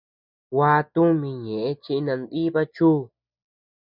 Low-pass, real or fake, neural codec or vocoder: 5.4 kHz; real; none